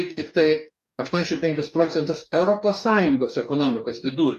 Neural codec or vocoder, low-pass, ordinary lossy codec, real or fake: codec, 44.1 kHz, 2.6 kbps, DAC; 14.4 kHz; MP3, 96 kbps; fake